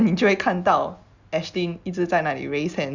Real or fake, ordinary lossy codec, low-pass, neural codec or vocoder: real; Opus, 64 kbps; 7.2 kHz; none